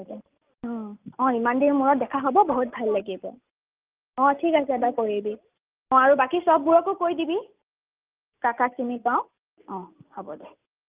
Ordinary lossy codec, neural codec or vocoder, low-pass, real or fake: Opus, 32 kbps; none; 3.6 kHz; real